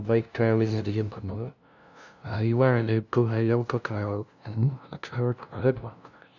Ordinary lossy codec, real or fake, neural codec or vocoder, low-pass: none; fake; codec, 16 kHz, 0.5 kbps, FunCodec, trained on LibriTTS, 25 frames a second; 7.2 kHz